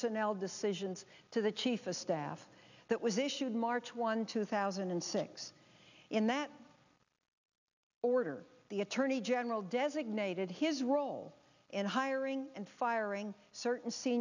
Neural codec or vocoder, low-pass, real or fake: none; 7.2 kHz; real